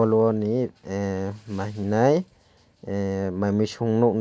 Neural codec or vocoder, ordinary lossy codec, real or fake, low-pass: none; none; real; none